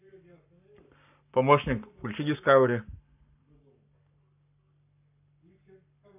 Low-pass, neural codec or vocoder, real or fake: 3.6 kHz; codec, 44.1 kHz, 7.8 kbps, Pupu-Codec; fake